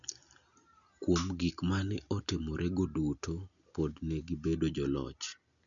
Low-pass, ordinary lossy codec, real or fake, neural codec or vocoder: 7.2 kHz; none; real; none